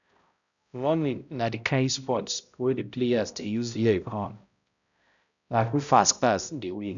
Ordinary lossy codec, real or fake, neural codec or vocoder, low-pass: none; fake; codec, 16 kHz, 0.5 kbps, X-Codec, HuBERT features, trained on balanced general audio; 7.2 kHz